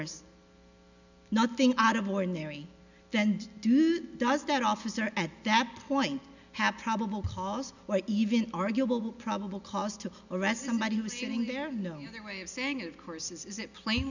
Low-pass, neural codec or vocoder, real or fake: 7.2 kHz; none; real